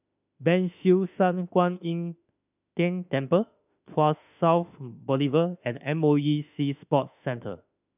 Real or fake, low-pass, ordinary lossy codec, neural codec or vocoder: fake; 3.6 kHz; none; autoencoder, 48 kHz, 32 numbers a frame, DAC-VAE, trained on Japanese speech